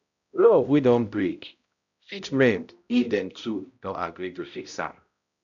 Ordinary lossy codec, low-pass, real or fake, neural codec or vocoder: none; 7.2 kHz; fake; codec, 16 kHz, 0.5 kbps, X-Codec, HuBERT features, trained on balanced general audio